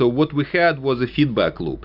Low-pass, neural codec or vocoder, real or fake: 5.4 kHz; none; real